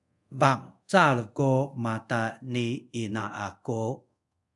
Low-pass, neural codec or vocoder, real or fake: 10.8 kHz; codec, 24 kHz, 0.5 kbps, DualCodec; fake